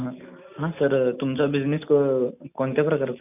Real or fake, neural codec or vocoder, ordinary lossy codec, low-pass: real; none; none; 3.6 kHz